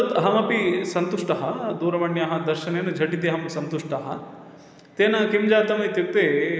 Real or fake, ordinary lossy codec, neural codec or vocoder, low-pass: real; none; none; none